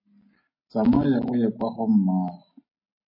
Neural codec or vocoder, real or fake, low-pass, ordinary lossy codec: none; real; 5.4 kHz; MP3, 24 kbps